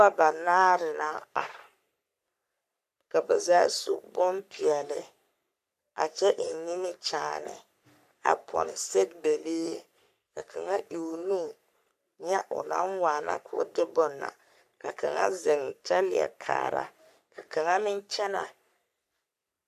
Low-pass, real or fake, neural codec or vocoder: 14.4 kHz; fake; codec, 44.1 kHz, 3.4 kbps, Pupu-Codec